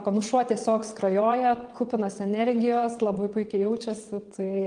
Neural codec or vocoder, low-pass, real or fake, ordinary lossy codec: vocoder, 22.05 kHz, 80 mel bands, WaveNeXt; 9.9 kHz; fake; Opus, 24 kbps